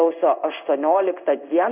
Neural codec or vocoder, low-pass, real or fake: codec, 16 kHz in and 24 kHz out, 1 kbps, XY-Tokenizer; 3.6 kHz; fake